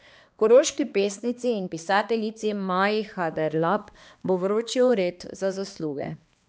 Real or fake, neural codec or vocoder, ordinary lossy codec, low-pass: fake; codec, 16 kHz, 2 kbps, X-Codec, HuBERT features, trained on balanced general audio; none; none